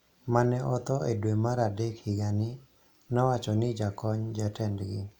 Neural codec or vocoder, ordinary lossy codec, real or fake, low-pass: none; none; real; 19.8 kHz